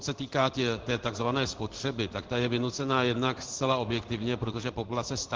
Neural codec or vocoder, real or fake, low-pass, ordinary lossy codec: codec, 16 kHz in and 24 kHz out, 1 kbps, XY-Tokenizer; fake; 7.2 kHz; Opus, 16 kbps